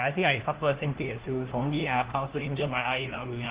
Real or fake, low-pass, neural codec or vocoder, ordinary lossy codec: fake; 3.6 kHz; codec, 16 kHz, 1 kbps, FunCodec, trained on LibriTTS, 50 frames a second; Opus, 16 kbps